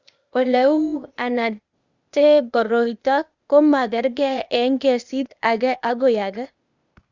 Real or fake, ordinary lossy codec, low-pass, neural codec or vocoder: fake; Opus, 64 kbps; 7.2 kHz; codec, 16 kHz, 0.8 kbps, ZipCodec